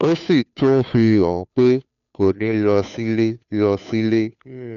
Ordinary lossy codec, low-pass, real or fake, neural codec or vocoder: none; 7.2 kHz; fake; codec, 16 kHz, 2 kbps, FunCodec, trained on Chinese and English, 25 frames a second